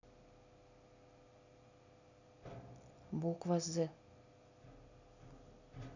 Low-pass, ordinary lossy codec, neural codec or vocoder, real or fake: 7.2 kHz; none; none; real